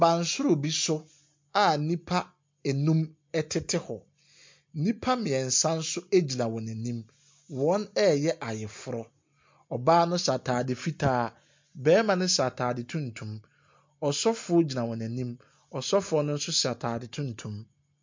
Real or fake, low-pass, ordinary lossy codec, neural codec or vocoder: real; 7.2 kHz; MP3, 48 kbps; none